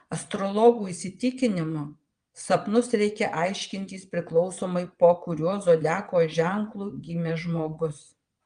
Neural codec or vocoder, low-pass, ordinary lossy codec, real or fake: vocoder, 22.05 kHz, 80 mel bands, WaveNeXt; 9.9 kHz; Opus, 32 kbps; fake